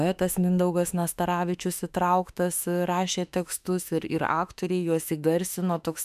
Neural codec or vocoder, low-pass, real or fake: autoencoder, 48 kHz, 32 numbers a frame, DAC-VAE, trained on Japanese speech; 14.4 kHz; fake